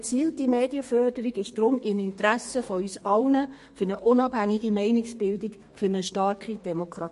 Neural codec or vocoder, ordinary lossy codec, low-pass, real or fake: codec, 32 kHz, 1.9 kbps, SNAC; MP3, 48 kbps; 14.4 kHz; fake